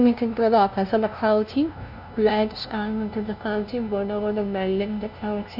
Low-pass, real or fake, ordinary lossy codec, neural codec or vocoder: 5.4 kHz; fake; none; codec, 16 kHz, 0.5 kbps, FunCodec, trained on LibriTTS, 25 frames a second